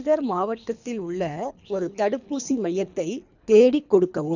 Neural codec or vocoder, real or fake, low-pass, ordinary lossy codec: codec, 24 kHz, 3 kbps, HILCodec; fake; 7.2 kHz; none